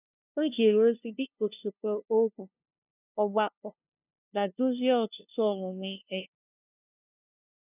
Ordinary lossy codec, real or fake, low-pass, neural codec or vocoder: none; fake; 3.6 kHz; codec, 16 kHz, 0.5 kbps, FunCodec, trained on LibriTTS, 25 frames a second